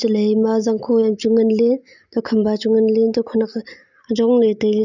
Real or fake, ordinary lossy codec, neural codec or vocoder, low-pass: real; none; none; 7.2 kHz